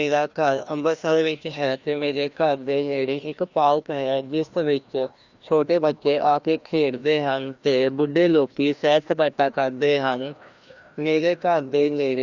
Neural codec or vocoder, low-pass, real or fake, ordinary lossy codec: codec, 16 kHz, 1 kbps, FreqCodec, larger model; 7.2 kHz; fake; Opus, 64 kbps